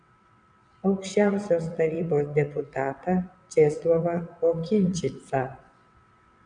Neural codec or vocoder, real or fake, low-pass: vocoder, 22.05 kHz, 80 mel bands, WaveNeXt; fake; 9.9 kHz